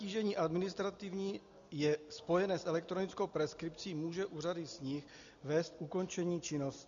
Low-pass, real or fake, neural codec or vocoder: 7.2 kHz; real; none